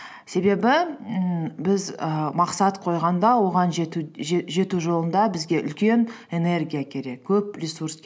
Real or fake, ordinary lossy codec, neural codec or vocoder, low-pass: real; none; none; none